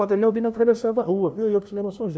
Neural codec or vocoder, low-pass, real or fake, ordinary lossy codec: codec, 16 kHz, 0.5 kbps, FunCodec, trained on LibriTTS, 25 frames a second; none; fake; none